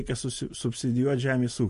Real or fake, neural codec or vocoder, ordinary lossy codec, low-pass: real; none; MP3, 48 kbps; 14.4 kHz